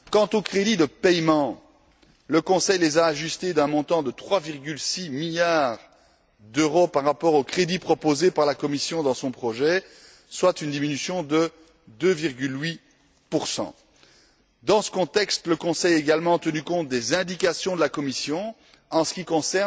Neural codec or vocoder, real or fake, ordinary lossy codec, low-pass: none; real; none; none